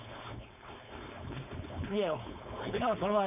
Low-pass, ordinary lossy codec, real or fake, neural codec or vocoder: 3.6 kHz; none; fake; codec, 16 kHz, 4.8 kbps, FACodec